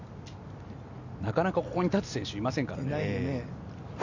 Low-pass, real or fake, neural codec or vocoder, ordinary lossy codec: 7.2 kHz; real; none; none